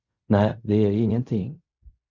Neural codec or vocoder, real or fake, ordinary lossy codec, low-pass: codec, 16 kHz in and 24 kHz out, 0.4 kbps, LongCat-Audio-Codec, fine tuned four codebook decoder; fake; Opus, 64 kbps; 7.2 kHz